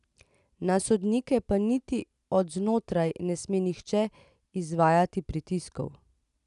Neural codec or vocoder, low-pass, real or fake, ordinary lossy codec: none; 10.8 kHz; real; none